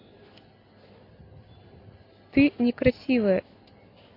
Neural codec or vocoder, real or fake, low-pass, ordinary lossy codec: none; real; 5.4 kHz; AAC, 32 kbps